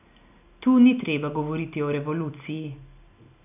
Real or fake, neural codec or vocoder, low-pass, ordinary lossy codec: real; none; 3.6 kHz; none